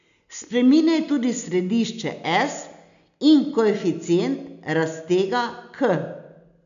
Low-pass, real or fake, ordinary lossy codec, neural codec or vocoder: 7.2 kHz; real; none; none